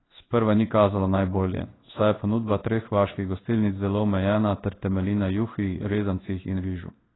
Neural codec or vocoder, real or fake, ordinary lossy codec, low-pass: codec, 16 kHz in and 24 kHz out, 1 kbps, XY-Tokenizer; fake; AAC, 16 kbps; 7.2 kHz